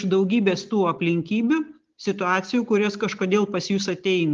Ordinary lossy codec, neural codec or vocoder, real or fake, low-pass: Opus, 32 kbps; none; real; 7.2 kHz